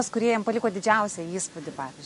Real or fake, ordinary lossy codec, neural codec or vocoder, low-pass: real; MP3, 48 kbps; none; 14.4 kHz